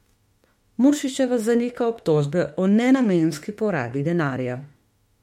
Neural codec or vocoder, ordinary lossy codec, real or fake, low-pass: autoencoder, 48 kHz, 32 numbers a frame, DAC-VAE, trained on Japanese speech; MP3, 64 kbps; fake; 19.8 kHz